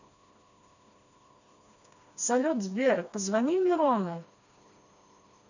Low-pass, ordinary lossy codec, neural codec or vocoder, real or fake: 7.2 kHz; none; codec, 16 kHz, 2 kbps, FreqCodec, smaller model; fake